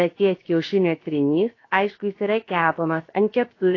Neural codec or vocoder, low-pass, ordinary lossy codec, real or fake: codec, 16 kHz, about 1 kbps, DyCAST, with the encoder's durations; 7.2 kHz; AAC, 32 kbps; fake